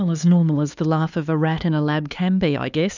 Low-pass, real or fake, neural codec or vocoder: 7.2 kHz; fake; codec, 16 kHz, 4 kbps, X-Codec, HuBERT features, trained on LibriSpeech